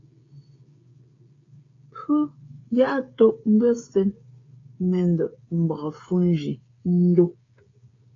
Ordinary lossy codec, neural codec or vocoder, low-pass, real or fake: AAC, 32 kbps; codec, 16 kHz, 8 kbps, FreqCodec, smaller model; 7.2 kHz; fake